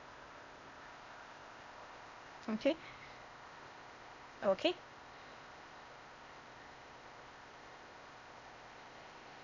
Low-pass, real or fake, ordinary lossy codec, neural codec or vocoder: 7.2 kHz; fake; none; codec, 16 kHz, 0.8 kbps, ZipCodec